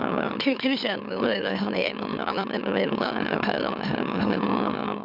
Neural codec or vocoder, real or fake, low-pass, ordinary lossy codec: autoencoder, 44.1 kHz, a latent of 192 numbers a frame, MeloTTS; fake; 5.4 kHz; none